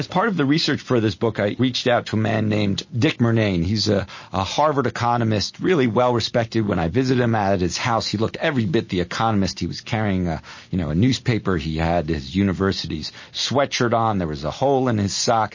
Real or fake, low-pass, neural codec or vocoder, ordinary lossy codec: real; 7.2 kHz; none; MP3, 32 kbps